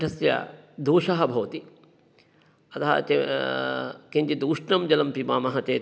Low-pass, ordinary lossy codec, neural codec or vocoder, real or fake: none; none; none; real